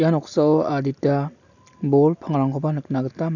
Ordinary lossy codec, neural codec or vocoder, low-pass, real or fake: none; none; 7.2 kHz; real